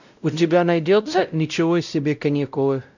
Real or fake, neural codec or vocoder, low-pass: fake; codec, 16 kHz, 0.5 kbps, X-Codec, WavLM features, trained on Multilingual LibriSpeech; 7.2 kHz